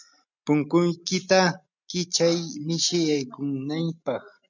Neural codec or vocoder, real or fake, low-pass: none; real; 7.2 kHz